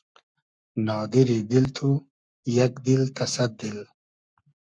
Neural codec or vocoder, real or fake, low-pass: codec, 44.1 kHz, 7.8 kbps, Pupu-Codec; fake; 9.9 kHz